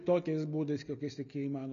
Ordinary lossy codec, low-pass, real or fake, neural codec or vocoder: MP3, 96 kbps; 7.2 kHz; real; none